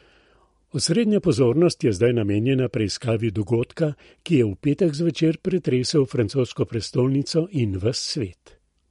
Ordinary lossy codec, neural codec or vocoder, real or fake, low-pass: MP3, 48 kbps; none; real; 19.8 kHz